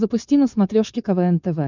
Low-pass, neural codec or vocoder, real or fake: 7.2 kHz; codec, 16 kHz, 4.8 kbps, FACodec; fake